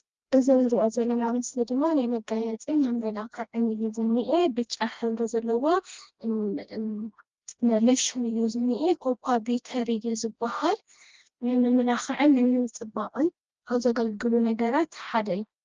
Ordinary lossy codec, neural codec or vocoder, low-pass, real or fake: Opus, 24 kbps; codec, 16 kHz, 1 kbps, FreqCodec, smaller model; 7.2 kHz; fake